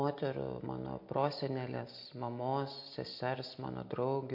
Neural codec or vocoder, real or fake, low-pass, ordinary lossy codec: none; real; 5.4 kHz; MP3, 32 kbps